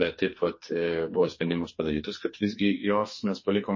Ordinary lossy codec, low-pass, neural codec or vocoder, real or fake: MP3, 32 kbps; 7.2 kHz; codec, 44.1 kHz, 2.6 kbps, SNAC; fake